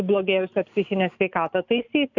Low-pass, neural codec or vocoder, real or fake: 7.2 kHz; none; real